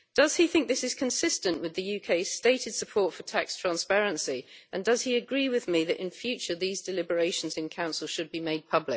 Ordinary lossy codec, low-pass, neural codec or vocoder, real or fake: none; none; none; real